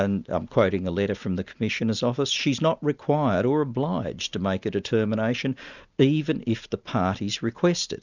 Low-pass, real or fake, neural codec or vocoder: 7.2 kHz; real; none